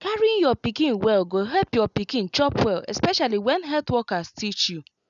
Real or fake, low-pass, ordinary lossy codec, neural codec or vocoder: real; 7.2 kHz; none; none